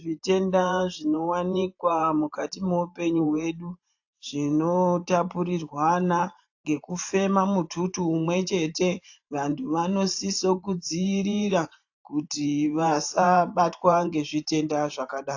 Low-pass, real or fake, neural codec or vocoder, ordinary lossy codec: 7.2 kHz; fake; vocoder, 44.1 kHz, 128 mel bands every 512 samples, BigVGAN v2; AAC, 48 kbps